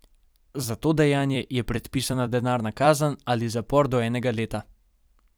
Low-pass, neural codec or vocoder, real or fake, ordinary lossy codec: none; vocoder, 44.1 kHz, 128 mel bands every 256 samples, BigVGAN v2; fake; none